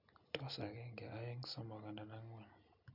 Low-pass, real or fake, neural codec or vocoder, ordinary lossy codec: 5.4 kHz; real; none; none